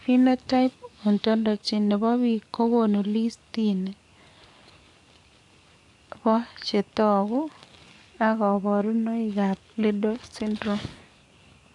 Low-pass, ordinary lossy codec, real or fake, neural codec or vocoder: 10.8 kHz; AAC, 64 kbps; fake; codec, 44.1 kHz, 7.8 kbps, DAC